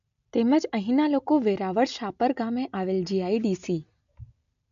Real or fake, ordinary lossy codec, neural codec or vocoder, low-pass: real; AAC, 96 kbps; none; 7.2 kHz